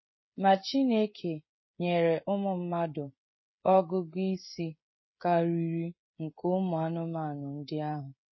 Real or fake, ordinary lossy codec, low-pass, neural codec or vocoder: fake; MP3, 24 kbps; 7.2 kHz; codec, 16 kHz, 16 kbps, FreqCodec, smaller model